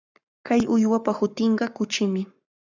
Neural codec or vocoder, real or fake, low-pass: codec, 44.1 kHz, 7.8 kbps, Pupu-Codec; fake; 7.2 kHz